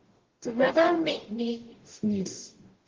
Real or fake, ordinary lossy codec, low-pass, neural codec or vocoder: fake; Opus, 16 kbps; 7.2 kHz; codec, 44.1 kHz, 0.9 kbps, DAC